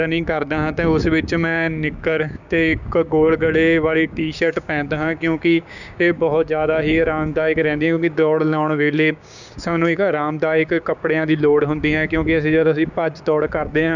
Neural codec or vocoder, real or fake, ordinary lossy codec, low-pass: codec, 16 kHz, 6 kbps, DAC; fake; none; 7.2 kHz